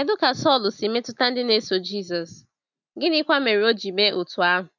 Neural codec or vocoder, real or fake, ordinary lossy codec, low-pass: none; real; none; 7.2 kHz